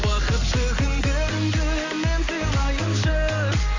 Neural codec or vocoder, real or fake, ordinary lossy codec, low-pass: none; real; AAC, 48 kbps; 7.2 kHz